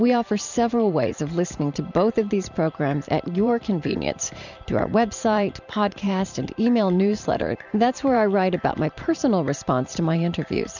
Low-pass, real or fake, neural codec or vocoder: 7.2 kHz; fake; vocoder, 44.1 kHz, 128 mel bands every 512 samples, BigVGAN v2